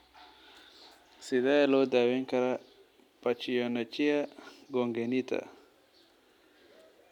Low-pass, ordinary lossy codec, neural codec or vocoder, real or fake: 19.8 kHz; none; none; real